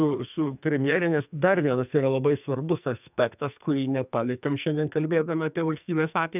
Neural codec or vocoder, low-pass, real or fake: codec, 44.1 kHz, 2.6 kbps, SNAC; 3.6 kHz; fake